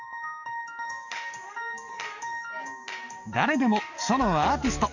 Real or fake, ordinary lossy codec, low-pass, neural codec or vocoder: fake; none; 7.2 kHz; codec, 44.1 kHz, 7.8 kbps, DAC